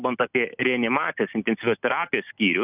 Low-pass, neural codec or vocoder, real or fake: 3.6 kHz; none; real